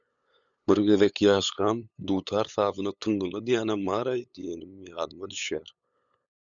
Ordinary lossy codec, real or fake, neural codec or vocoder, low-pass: MP3, 96 kbps; fake; codec, 16 kHz, 8 kbps, FunCodec, trained on LibriTTS, 25 frames a second; 7.2 kHz